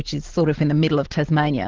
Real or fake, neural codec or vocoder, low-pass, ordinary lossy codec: real; none; 7.2 kHz; Opus, 16 kbps